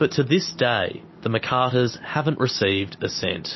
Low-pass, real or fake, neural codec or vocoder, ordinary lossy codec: 7.2 kHz; real; none; MP3, 24 kbps